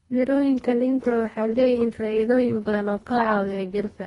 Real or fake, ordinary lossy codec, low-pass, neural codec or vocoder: fake; AAC, 32 kbps; 10.8 kHz; codec, 24 kHz, 1.5 kbps, HILCodec